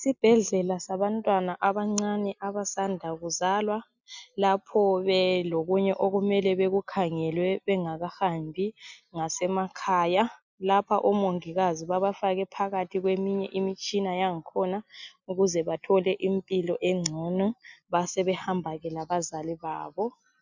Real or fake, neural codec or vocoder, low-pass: real; none; 7.2 kHz